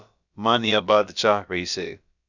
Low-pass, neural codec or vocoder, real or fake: 7.2 kHz; codec, 16 kHz, about 1 kbps, DyCAST, with the encoder's durations; fake